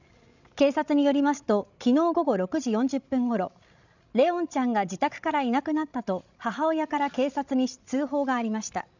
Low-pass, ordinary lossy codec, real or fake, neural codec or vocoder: 7.2 kHz; none; fake; codec, 16 kHz, 16 kbps, FreqCodec, larger model